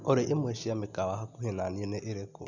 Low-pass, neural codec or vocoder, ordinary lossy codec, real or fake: 7.2 kHz; none; none; real